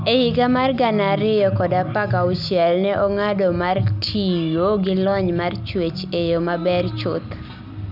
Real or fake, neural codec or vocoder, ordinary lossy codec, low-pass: real; none; none; 5.4 kHz